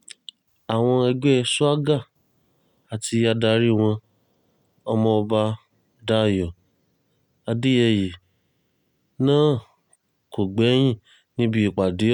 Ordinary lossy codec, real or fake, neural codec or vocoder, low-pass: none; real; none; 19.8 kHz